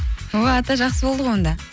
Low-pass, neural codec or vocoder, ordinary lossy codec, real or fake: none; none; none; real